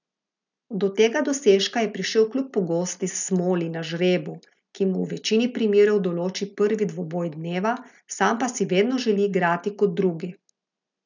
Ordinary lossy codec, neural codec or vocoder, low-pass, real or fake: none; none; 7.2 kHz; real